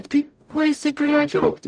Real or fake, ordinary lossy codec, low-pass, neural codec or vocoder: fake; MP3, 96 kbps; 9.9 kHz; codec, 44.1 kHz, 0.9 kbps, DAC